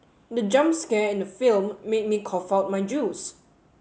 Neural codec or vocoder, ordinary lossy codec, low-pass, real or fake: none; none; none; real